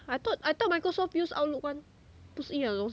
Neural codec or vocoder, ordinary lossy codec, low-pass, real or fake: none; none; none; real